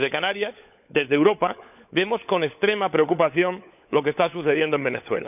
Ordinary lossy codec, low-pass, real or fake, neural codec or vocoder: none; 3.6 kHz; fake; codec, 16 kHz, 16 kbps, FunCodec, trained on LibriTTS, 50 frames a second